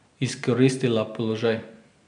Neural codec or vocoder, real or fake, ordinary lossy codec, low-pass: none; real; none; 9.9 kHz